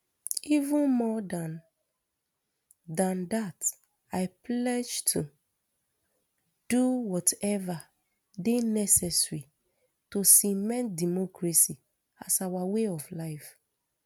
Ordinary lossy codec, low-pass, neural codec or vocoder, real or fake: none; none; none; real